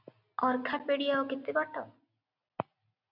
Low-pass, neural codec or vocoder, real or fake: 5.4 kHz; none; real